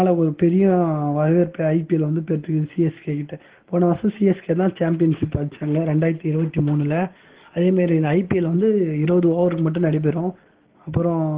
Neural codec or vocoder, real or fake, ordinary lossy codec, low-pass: none; real; Opus, 16 kbps; 3.6 kHz